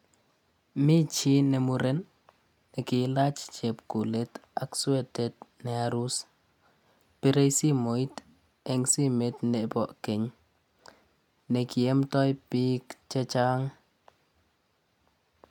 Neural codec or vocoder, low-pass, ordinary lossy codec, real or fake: none; 19.8 kHz; none; real